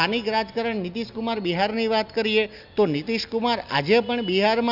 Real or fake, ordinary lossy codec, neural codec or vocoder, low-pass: real; Opus, 64 kbps; none; 5.4 kHz